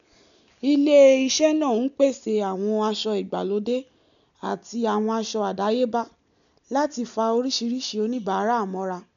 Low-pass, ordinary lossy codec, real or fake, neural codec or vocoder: 7.2 kHz; none; real; none